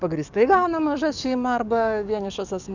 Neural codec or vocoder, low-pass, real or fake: codec, 44.1 kHz, 7.8 kbps, DAC; 7.2 kHz; fake